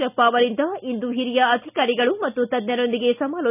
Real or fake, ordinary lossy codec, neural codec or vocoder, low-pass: real; none; none; 3.6 kHz